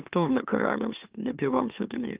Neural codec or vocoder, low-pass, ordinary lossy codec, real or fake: autoencoder, 44.1 kHz, a latent of 192 numbers a frame, MeloTTS; 3.6 kHz; Opus, 24 kbps; fake